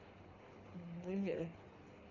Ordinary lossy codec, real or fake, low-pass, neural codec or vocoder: none; fake; 7.2 kHz; codec, 24 kHz, 6 kbps, HILCodec